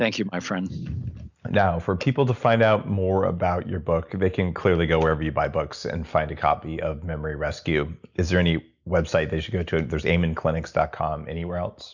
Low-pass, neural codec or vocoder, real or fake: 7.2 kHz; none; real